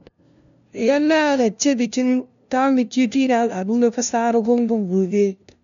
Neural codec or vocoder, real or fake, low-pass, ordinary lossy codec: codec, 16 kHz, 0.5 kbps, FunCodec, trained on LibriTTS, 25 frames a second; fake; 7.2 kHz; none